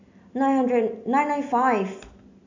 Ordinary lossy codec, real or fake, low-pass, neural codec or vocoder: AAC, 48 kbps; real; 7.2 kHz; none